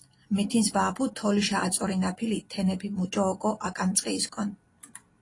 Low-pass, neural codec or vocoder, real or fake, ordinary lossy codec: 10.8 kHz; none; real; AAC, 32 kbps